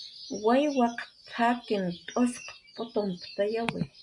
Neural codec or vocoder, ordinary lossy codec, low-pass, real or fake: none; MP3, 64 kbps; 10.8 kHz; real